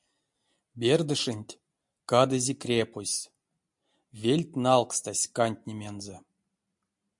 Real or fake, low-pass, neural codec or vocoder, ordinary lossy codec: real; 10.8 kHz; none; MP3, 64 kbps